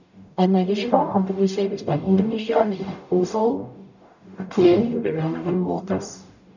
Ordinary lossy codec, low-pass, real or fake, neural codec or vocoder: none; 7.2 kHz; fake; codec, 44.1 kHz, 0.9 kbps, DAC